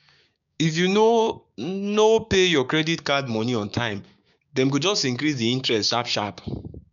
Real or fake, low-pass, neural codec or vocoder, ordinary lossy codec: fake; 7.2 kHz; codec, 16 kHz, 6 kbps, DAC; none